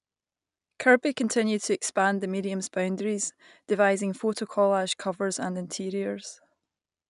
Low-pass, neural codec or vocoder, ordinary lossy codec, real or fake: 10.8 kHz; none; none; real